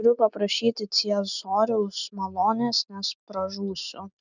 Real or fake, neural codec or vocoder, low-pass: real; none; 7.2 kHz